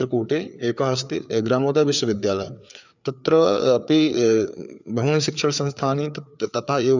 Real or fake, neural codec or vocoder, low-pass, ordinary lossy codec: fake; codec, 16 kHz, 4 kbps, FreqCodec, larger model; 7.2 kHz; none